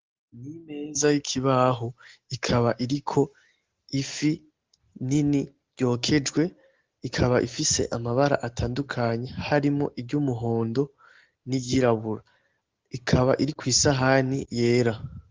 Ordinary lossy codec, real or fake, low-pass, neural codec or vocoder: Opus, 16 kbps; real; 7.2 kHz; none